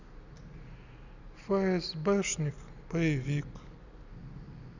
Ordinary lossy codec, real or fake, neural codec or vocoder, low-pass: none; real; none; 7.2 kHz